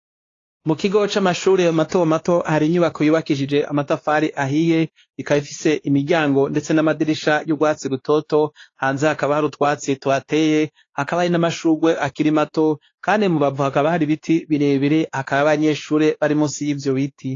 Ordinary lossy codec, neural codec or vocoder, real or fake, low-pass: AAC, 32 kbps; codec, 16 kHz, 2 kbps, X-Codec, WavLM features, trained on Multilingual LibriSpeech; fake; 7.2 kHz